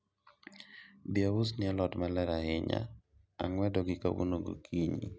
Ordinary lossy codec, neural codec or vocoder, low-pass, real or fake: none; none; none; real